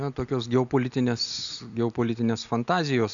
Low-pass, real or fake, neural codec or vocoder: 7.2 kHz; real; none